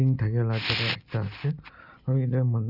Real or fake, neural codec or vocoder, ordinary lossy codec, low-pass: real; none; none; 5.4 kHz